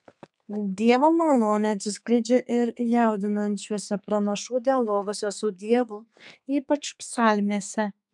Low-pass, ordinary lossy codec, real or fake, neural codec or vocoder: 10.8 kHz; MP3, 96 kbps; fake; codec, 32 kHz, 1.9 kbps, SNAC